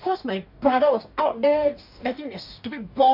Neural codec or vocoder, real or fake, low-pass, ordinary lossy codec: codec, 44.1 kHz, 2.6 kbps, DAC; fake; 5.4 kHz; none